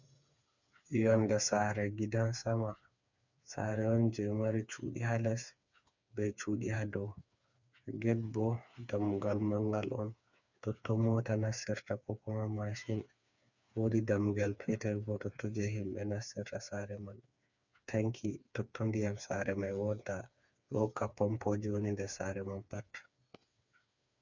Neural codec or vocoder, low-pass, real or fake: codec, 16 kHz, 4 kbps, FreqCodec, smaller model; 7.2 kHz; fake